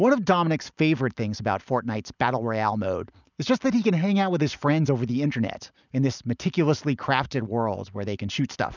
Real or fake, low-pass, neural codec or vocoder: real; 7.2 kHz; none